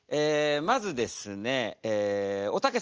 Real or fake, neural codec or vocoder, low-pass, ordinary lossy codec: real; none; 7.2 kHz; Opus, 24 kbps